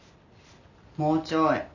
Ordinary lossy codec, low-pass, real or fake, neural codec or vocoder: none; 7.2 kHz; real; none